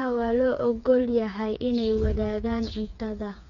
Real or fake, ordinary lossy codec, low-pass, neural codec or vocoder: fake; none; 7.2 kHz; codec, 16 kHz, 4 kbps, FreqCodec, smaller model